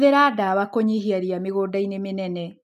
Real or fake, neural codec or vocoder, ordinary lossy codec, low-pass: real; none; AAC, 96 kbps; 14.4 kHz